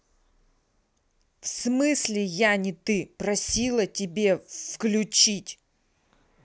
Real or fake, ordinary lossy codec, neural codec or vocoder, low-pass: real; none; none; none